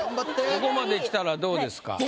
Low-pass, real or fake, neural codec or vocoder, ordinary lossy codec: none; real; none; none